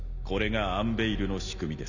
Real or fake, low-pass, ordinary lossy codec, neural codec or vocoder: real; 7.2 kHz; none; none